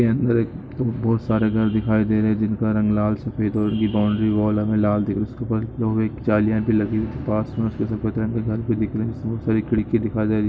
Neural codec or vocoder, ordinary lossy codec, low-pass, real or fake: none; none; none; real